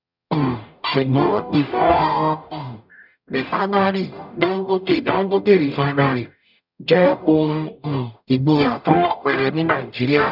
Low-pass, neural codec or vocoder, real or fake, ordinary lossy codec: 5.4 kHz; codec, 44.1 kHz, 0.9 kbps, DAC; fake; none